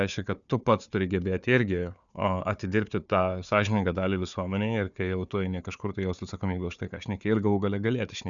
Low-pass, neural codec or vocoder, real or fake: 7.2 kHz; codec, 16 kHz, 4 kbps, FunCodec, trained on Chinese and English, 50 frames a second; fake